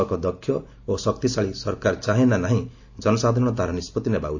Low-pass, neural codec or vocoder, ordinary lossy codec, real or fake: 7.2 kHz; none; AAC, 48 kbps; real